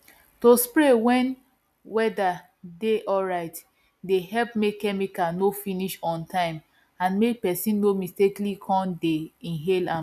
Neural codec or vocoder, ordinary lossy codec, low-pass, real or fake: none; none; 14.4 kHz; real